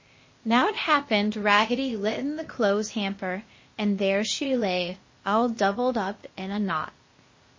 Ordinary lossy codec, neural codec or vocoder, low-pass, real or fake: MP3, 32 kbps; codec, 16 kHz, 0.8 kbps, ZipCodec; 7.2 kHz; fake